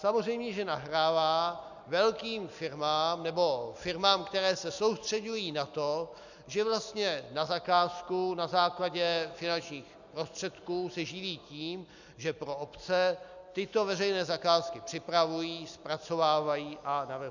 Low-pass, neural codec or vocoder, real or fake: 7.2 kHz; none; real